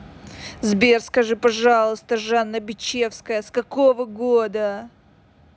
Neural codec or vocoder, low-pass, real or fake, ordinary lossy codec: none; none; real; none